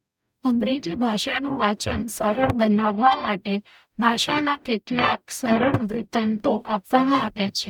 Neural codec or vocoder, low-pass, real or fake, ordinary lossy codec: codec, 44.1 kHz, 0.9 kbps, DAC; 19.8 kHz; fake; none